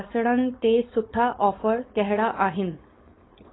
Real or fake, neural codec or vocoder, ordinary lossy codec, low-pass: fake; codec, 16 kHz, 4.8 kbps, FACodec; AAC, 16 kbps; 7.2 kHz